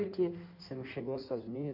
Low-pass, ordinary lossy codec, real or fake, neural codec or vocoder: 5.4 kHz; none; fake; codec, 16 kHz in and 24 kHz out, 1.1 kbps, FireRedTTS-2 codec